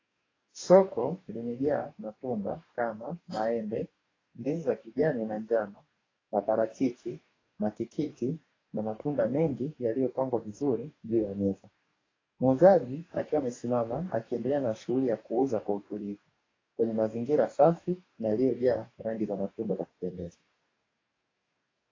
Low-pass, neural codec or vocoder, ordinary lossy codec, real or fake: 7.2 kHz; codec, 44.1 kHz, 2.6 kbps, DAC; AAC, 32 kbps; fake